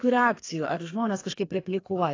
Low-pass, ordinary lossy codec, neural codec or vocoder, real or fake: 7.2 kHz; AAC, 32 kbps; codec, 32 kHz, 1.9 kbps, SNAC; fake